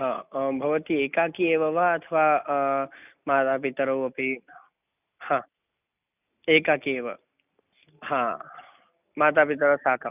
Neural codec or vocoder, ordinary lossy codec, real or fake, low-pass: none; none; real; 3.6 kHz